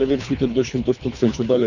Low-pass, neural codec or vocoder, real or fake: 7.2 kHz; codec, 24 kHz, 3 kbps, HILCodec; fake